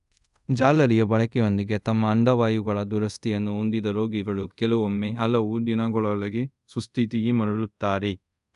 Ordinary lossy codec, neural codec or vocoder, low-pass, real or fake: none; codec, 24 kHz, 0.5 kbps, DualCodec; 10.8 kHz; fake